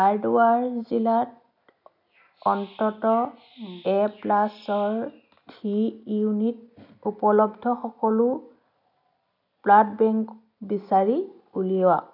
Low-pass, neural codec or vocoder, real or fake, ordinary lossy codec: 5.4 kHz; none; real; none